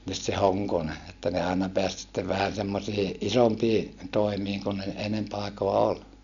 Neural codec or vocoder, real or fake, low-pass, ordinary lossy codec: none; real; 7.2 kHz; none